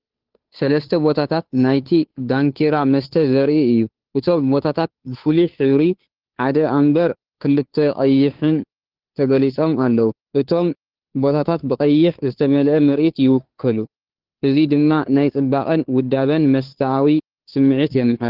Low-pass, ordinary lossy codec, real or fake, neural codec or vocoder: 5.4 kHz; Opus, 16 kbps; fake; codec, 16 kHz, 2 kbps, FunCodec, trained on Chinese and English, 25 frames a second